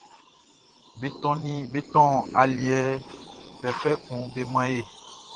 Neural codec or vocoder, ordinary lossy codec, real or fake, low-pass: vocoder, 22.05 kHz, 80 mel bands, Vocos; Opus, 16 kbps; fake; 9.9 kHz